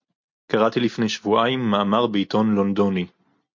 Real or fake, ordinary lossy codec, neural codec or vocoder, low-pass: real; MP3, 48 kbps; none; 7.2 kHz